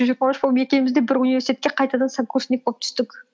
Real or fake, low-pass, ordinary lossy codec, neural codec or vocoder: real; none; none; none